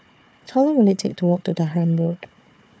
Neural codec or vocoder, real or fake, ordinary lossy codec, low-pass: codec, 16 kHz, 4 kbps, FunCodec, trained on Chinese and English, 50 frames a second; fake; none; none